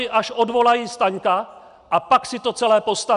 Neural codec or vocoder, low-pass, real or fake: none; 10.8 kHz; real